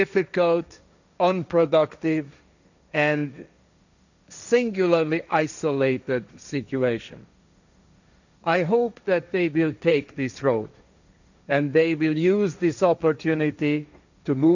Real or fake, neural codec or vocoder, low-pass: fake; codec, 16 kHz, 1.1 kbps, Voila-Tokenizer; 7.2 kHz